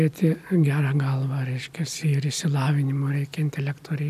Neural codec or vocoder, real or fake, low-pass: none; real; 14.4 kHz